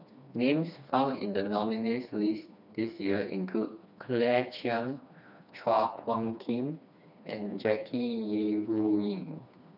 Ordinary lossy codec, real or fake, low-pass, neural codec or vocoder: none; fake; 5.4 kHz; codec, 16 kHz, 2 kbps, FreqCodec, smaller model